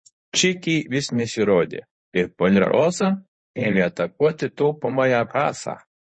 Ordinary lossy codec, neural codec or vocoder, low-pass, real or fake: MP3, 32 kbps; codec, 24 kHz, 0.9 kbps, WavTokenizer, medium speech release version 1; 9.9 kHz; fake